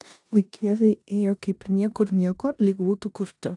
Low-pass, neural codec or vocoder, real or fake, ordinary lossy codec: 10.8 kHz; codec, 16 kHz in and 24 kHz out, 0.9 kbps, LongCat-Audio-Codec, four codebook decoder; fake; AAC, 64 kbps